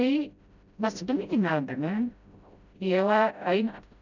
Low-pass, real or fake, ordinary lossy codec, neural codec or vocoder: 7.2 kHz; fake; none; codec, 16 kHz, 0.5 kbps, FreqCodec, smaller model